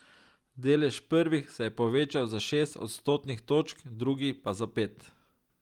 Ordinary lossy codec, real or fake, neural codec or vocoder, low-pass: Opus, 32 kbps; real; none; 19.8 kHz